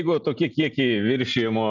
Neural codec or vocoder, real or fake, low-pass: none; real; 7.2 kHz